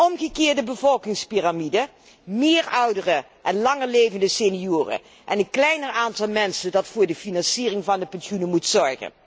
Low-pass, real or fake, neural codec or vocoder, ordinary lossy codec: none; real; none; none